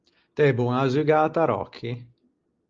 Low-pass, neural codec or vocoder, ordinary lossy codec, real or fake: 7.2 kHz; none; Opus, 32 kbps; real